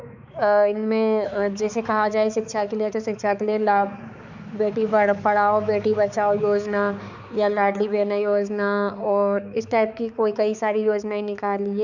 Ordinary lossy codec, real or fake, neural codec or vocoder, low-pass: none; fake; codec, 16 kHz, 4 kbps, X-Codec, HuBERT features, trained on balanced general audio; 7.2 kHz